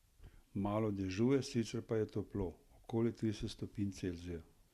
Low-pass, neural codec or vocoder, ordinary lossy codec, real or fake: 14.4 kHz; none; Opus, 64 kbps; real